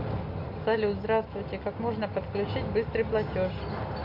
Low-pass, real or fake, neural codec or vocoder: 5.4 kHz; real; none